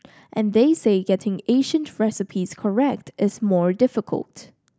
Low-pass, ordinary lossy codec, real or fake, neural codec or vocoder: none; none; real; none